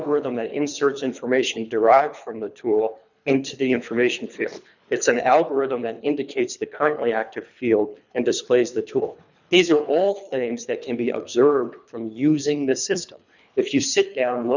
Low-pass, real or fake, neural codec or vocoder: 7.2 kHz; fake; codec, 24 kHz, 3 kbps, HILCodec